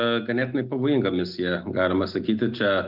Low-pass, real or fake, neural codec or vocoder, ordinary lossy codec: 5.4 kHz; real; none; Opus, 32 kbps